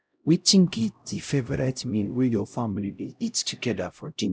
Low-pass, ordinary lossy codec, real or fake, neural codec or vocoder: none; none; fake; codec, 16 kHz, 0.5 kbps, X-Codec, HuBERT features, trained on LibriSpeech